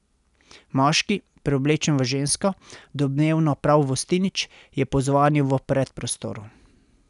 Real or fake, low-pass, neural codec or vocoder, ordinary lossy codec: real; 10.8 kHz; none; none